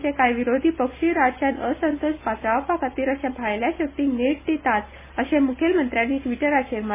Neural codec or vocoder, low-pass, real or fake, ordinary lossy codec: none; 3.6 kHz; real; MP3, 16 kbps